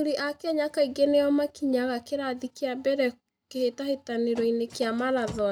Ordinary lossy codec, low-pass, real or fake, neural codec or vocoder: none; 19.8 kHz; real; none